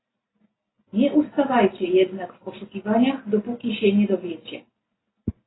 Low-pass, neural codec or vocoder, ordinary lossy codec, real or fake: 7.2 kHz; none; AAC, 16 kbps; real